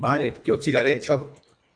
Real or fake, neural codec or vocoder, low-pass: fake; codec, 24 kHz, 1.5 kbps, HILCodec; 9.9 kHz